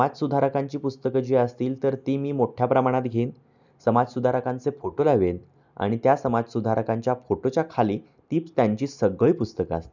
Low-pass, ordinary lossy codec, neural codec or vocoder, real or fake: 7.2 kHz; none; none; real